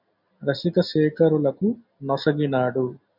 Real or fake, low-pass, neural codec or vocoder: real; 5.4 kHz; none